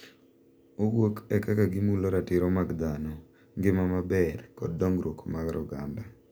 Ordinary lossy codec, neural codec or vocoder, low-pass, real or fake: none; none; none; real